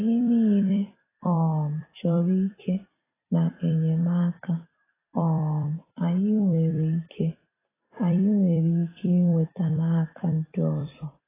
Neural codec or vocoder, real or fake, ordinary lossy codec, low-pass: vocoder, 44.1 kHz, 128 mel bands every 256 samples, BigVGAN v2; fake; AAC, 16 kbps; 3.6 kHz